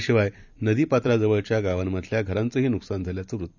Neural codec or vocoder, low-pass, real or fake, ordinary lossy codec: vocoder, 44.1 kHz, 128 mel bands every 256 samples, BigVGAN v2; 7.2 kHz; fake; none